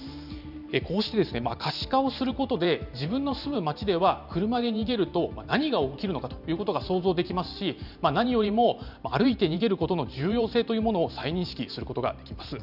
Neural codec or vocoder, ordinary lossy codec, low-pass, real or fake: vocoder, 44.1 kHz, 128 mel bands every 512 samples, BigVGAN v2; none; 5.4 kHz; fake